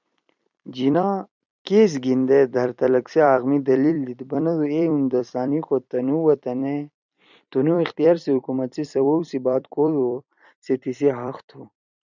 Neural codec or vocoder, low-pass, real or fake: vocoder, 44.1 kHz, 128 mel bands every 256 samples, BigVGAN v2; 7.2 kHz; fake